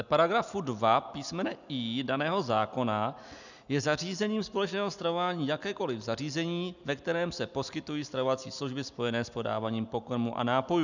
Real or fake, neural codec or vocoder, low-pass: real; none; 7.2 kHz